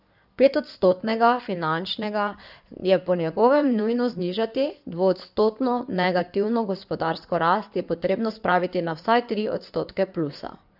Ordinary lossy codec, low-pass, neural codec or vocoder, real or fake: none; 5.4 kHz; codec, 16 kHz in and 24 kHz out, 2.2 kbps, FireRedTTS-2 codec; fake